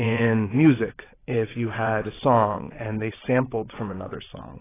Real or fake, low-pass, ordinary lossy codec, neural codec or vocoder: fake; 3.6 kHz; AAC, 16 kbps; vocoder, 22.05 kHz, 80 mel bands, WaveNeXt